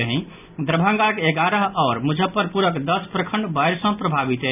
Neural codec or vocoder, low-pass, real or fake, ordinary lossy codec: none; 3.6 kHz; real; none